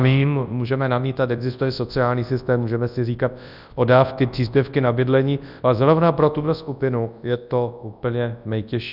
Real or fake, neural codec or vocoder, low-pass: fake; codec, 24 kHz, 0.9 kbps, WavTokenizer, large speech release; 5.4 kHz